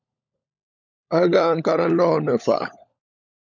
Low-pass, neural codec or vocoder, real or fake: 7.2 kHz; codec, 16 kHz, 16 kbps, FunCodec, trained on LibriTTS, 50 frames a second; fake